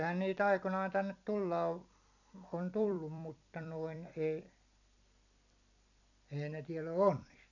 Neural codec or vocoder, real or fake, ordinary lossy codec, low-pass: vocoder, 44.1 kHz, 128 mel bands every 256 samples, BigVGAN v2; fake; none; 7.2 kHz